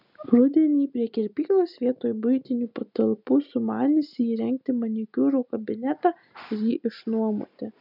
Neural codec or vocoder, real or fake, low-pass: none; real; 5.4 kHz